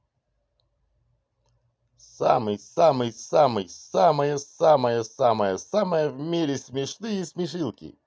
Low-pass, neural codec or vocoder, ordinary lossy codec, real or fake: none; none; none; real